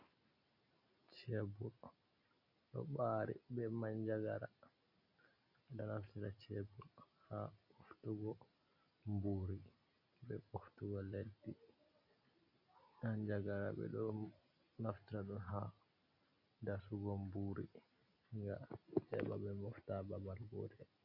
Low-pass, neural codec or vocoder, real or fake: 5.4 kHz; none; real